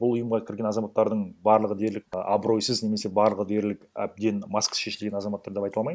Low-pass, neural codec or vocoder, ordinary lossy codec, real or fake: none; none; none; real